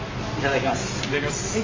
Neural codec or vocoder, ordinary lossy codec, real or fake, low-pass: none; AAC, 32 kbps; real; 7.2 kHz